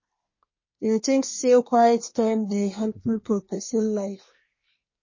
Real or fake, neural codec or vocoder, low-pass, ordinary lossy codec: fake; codec, 24 kHz, 1 kbps, SNAC; 7.2 kHz; MP3, 32 kbps